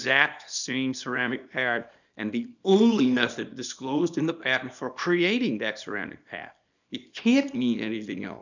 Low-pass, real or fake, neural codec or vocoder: 7.2 kHz; fake; codec, 24 kHz, 0.9 kbps, WavTokenizer, small release